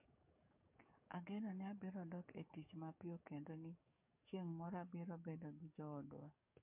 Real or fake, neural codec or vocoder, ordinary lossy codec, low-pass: fake; codec, 44.1 kHz, 7.8 kbps, DAC; MP3, 32 kbps; 3.6 kHz